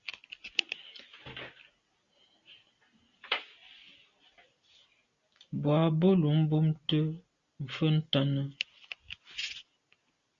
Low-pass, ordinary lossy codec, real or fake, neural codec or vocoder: 7.2 kHz; Opus, 64 kbps; real; none